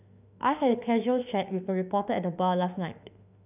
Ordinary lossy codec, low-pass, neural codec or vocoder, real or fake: none; 3.6 kHz; autoencoder, 48 kHz, 32 numbers a frame, DAC-VAE, trained on Japanese speech; fake